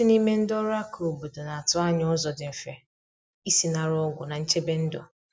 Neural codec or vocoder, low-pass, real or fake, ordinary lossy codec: none; none; real; none